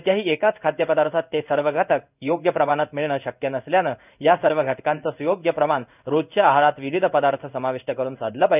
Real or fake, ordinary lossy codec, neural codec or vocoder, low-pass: fake; none; codec, 16 kHz in and 24 kHz out, 1 kbps, XY-Tokenizer; 3.6 kHz